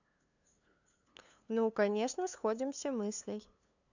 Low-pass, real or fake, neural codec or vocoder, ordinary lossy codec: 7.2 kHz; fake; codec, 16 kHz, 2 kbps, FunCodec, trained on LibriTTS, 25 frames a second; none